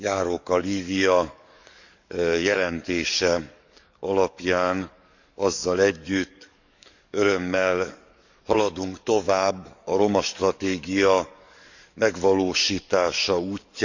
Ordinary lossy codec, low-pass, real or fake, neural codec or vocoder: none; 7.2 kHz; fake; codec, 44.1 kHz, 7.8 kbps, DAC